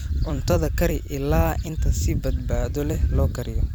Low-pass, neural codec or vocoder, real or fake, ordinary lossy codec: none; vocoder, 44.1 kHz, 128 mel bands every 256 samples, BigVGAN v2; fake; none